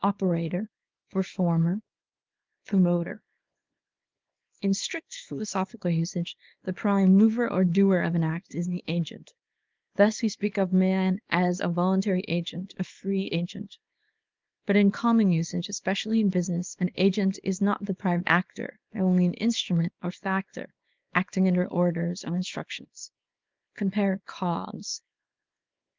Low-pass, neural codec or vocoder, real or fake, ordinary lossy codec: 7.2 kHz; codec, 24 kHz, 0.9 kbps, WavTokenizer, small release; fake; Opus, 32 kbps